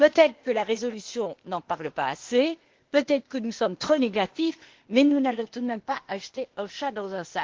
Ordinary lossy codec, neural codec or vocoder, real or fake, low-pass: Opus, 16 kbps; codec, 16 kHz, 0.8 kbps, ZipCodec; fake; 7.2 kHz